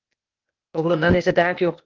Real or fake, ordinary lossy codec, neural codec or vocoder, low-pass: fake; Opus, 32 kbps; codec, 16 kHz, 0.8 kbps, ZipCodec; 7.2 kHz